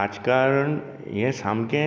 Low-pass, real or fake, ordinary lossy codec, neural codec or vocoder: none; real; none; none